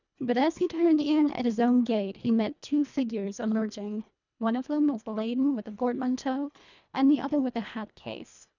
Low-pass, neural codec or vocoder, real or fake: 7.2 kHz; codec, 24 kHz, 1.5 kbps, HILCodec; fake